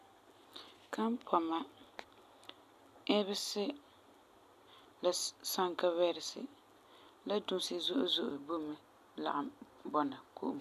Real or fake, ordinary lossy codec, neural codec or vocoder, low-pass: real; none; none; 14.4 kHz